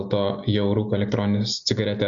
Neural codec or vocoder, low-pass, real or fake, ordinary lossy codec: none; 7.2 kHz; real; Opus, 64 kbps